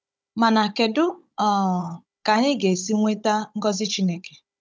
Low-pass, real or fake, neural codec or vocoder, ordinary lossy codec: none; fake; codec, 16 kHz, 16 kbps, FunCodec, trained on Chinese and English, 50 frames a second; none